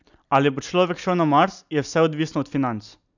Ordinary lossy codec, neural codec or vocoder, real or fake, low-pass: none; none; real; 7.2 kHz